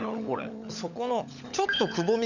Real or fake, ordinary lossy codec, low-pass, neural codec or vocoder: fake; none; 7.2 kHz; codec, 16 kHz, 16 kbps, FunCodec, trained on LibriTTS, 50 frames a second